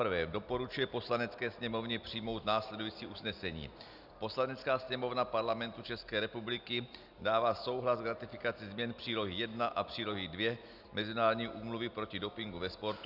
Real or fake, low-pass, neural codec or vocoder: real; 5.4 kHz; none